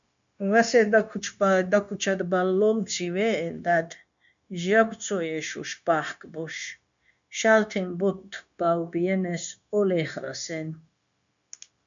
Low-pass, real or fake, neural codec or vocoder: 7.2 kHz; fake; codec, 16 kHz, 0.9 kbps, LongCat-Audio-Codec